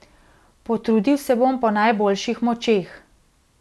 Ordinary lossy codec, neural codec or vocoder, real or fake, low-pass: none; none; real; none